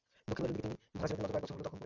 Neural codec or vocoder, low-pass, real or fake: none; 7.2 kHz; real